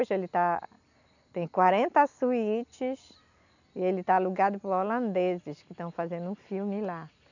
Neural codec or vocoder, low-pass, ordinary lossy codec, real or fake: none; 7.2 kHz; none; real